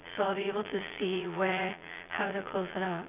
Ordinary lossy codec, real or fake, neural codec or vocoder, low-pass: none; fake; vocoder, 22.05 kHz, 80 mel bands, Vocos; 3.6 kHz